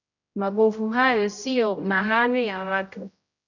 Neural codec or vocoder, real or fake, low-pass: codec, 16 kHz, 0.5 kbps, X-Codec, HuBERT features, trained on general audio; fake; 7.2 kHz